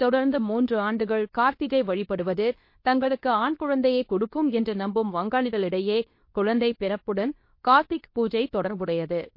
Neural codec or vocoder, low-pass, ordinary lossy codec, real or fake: codec, 24 kHz, 0.9 kbps, WavTokenizer, medium speech release version 2; 5.4 kHz; MP3, 32 kbps; fake